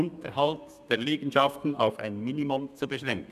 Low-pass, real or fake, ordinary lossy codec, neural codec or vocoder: 14.4 kHz; fake; none; codec, 32 kHz, 1.9 kbps, SNAC